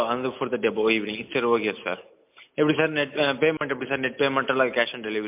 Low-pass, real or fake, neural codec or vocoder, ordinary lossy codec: 3.6 kHz; real; none; MP3, 32 kbps